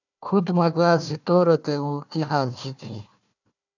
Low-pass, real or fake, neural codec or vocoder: 7.2 kHz; fake; codec, 16 kHz, 1 kbps, FunCodec, trained on Chinese and English, 50 frames a second